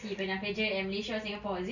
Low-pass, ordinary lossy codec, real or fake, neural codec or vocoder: 7.2 kHz; none; real; none